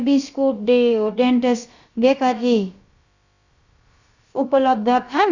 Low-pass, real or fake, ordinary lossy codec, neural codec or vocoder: 7.2 kHz; fake; Opus, 64 kbps; codec, 16 kHz, about 1 kbps, DyCAST, with the encoder's durations